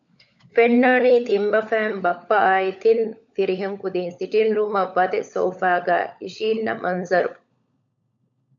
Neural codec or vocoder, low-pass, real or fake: codec, 16 kHz, 16 kbps, FunCodec, trained on LibriTTS, 50 frames a second; 7.2 kHz; fake